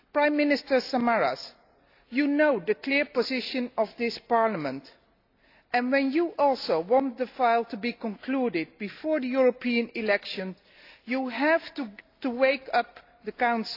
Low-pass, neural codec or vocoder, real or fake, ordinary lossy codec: 5.4 kHz; none; real; AAC, 32 kbps